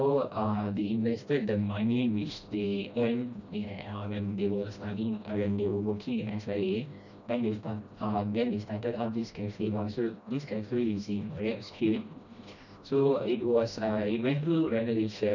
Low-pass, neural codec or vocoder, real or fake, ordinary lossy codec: 7.2 kHz; codec, 16 kHz, 1 kbps, FreqCodec, smaller model; fake; none